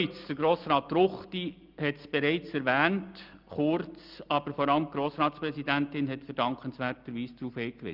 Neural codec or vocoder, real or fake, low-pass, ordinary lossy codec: none; real; 5.4 kHz; Opus, 24 kbps